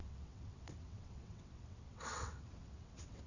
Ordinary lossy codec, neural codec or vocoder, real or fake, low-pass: none; vocoder, 22.05 kHz, 80 mel bands, WaveNeXt; fake; 7.2 kHz